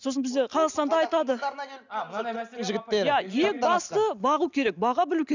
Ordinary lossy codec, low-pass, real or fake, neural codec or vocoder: none; 7.2 kHz; real; none